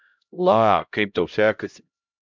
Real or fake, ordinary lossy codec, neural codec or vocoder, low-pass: fake; MP3, 64 kbps; codec, 16 kHz, 0.5 kbps, X-Codec, HuBERT features, trained on LibriSpeech; 7.2 kHz